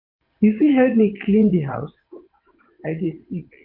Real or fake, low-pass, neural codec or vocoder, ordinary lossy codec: fake; 5.4 kHz; vocoder, 22.05 kHz, 80 mel bands, WaveNeXt; MP3, 32 kbps